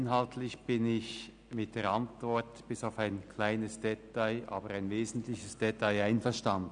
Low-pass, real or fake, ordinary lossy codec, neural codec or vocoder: 9.9 kHz; real; none; none